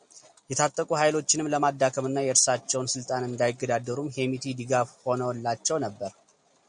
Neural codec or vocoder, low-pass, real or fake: none; 9.9 kHz; real